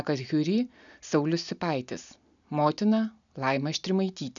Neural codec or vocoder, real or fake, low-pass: none; real; 7.2 kHz